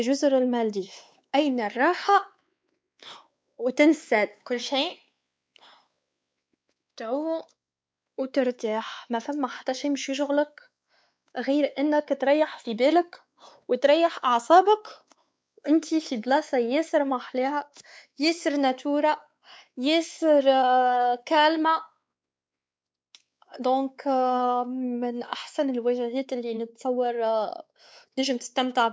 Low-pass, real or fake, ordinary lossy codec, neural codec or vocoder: none; fake; none; codec, 16 kHz, 4 kbps, X-Codec, WavLM features, trained on Multilingual LibriSpeech